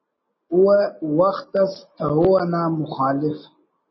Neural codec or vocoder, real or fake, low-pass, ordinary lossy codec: none; real; 7.2 kHz; MP3, 24 kbps